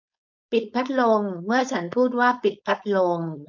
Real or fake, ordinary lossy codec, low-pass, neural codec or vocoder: fake; none; 7.2 kHz; codec, 16 kHz, 4.8 kbps, FACodec